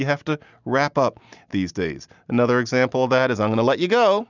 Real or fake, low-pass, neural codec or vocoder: real; 7.2 kHz; none